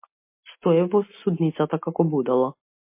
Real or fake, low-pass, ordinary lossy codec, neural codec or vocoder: real; 3.6 kHz; MP3, 24 kbps; none